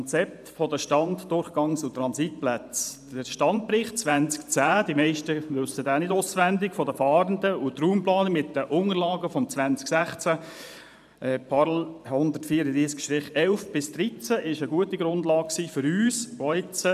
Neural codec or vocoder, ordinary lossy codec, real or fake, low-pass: vocoder, 44.1 kHz, 128 mel bands every 512 samples, BigVGAN v2; none; fake; 14.4 kHz